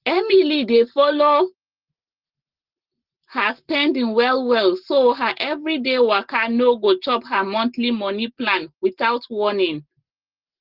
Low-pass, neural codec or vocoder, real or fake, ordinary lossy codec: 5.4 kHz; none; real; Opus, 16 kbps